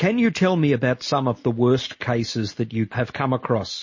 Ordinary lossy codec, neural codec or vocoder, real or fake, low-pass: MP3, 32 kbps; none; real; 7.2 kHz